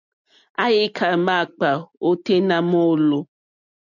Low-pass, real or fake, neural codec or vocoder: 7.2 kHz; real; none